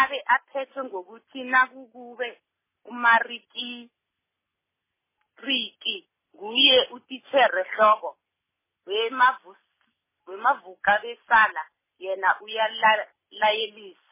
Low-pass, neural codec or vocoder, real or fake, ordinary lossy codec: 3.6 kHz; none; real; MP3, 16 kbps